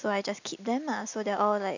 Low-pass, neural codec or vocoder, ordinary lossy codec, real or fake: 7.2 kHz; none; none; real